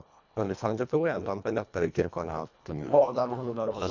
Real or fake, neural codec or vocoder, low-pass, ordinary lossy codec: fake; codec, 24 kHz, 1.5 kbps, HILCodec; 7.2 kHz; none